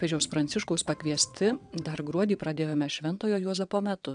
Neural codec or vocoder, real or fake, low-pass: vocoder, 22.05 kHz, 80 mel bands, WaveNeXt; fake; 9.9 kHz